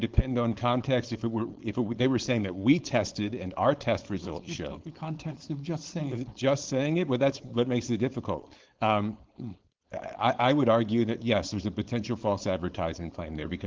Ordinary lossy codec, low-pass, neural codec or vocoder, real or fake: Opus, 16 kbps; 7.2 kHz; codec, 16 kHz, 4.8 kbps, FACodec; fake